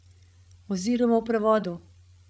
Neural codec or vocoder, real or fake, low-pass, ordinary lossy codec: codec, 16 kHz, 16 kbps, FreqCodec, larger model; fake; none; none